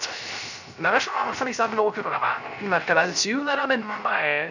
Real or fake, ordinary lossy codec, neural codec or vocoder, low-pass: fake; none; codec, 16 kHz, 0.3 kbps, FocalCodec; 7.2 kHz